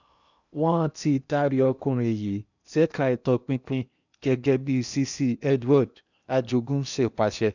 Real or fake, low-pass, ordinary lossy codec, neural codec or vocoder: fake; 7.2 kHz; none; codec, 16 kHz in and 24 kHz out, 0.8 kbps, FocalCodec, streaming, 65536 codes